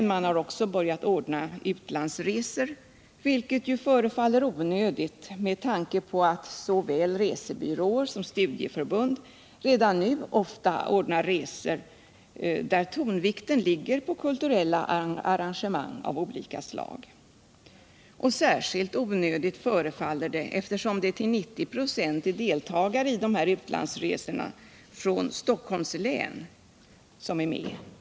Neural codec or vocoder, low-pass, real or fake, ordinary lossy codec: none; none; real; none